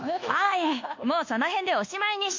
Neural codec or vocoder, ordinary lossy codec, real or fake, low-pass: codec, 24 kHz, 1.2 kbps, DualCodec; MP3, 48 kbps; fake; 7.2 kHz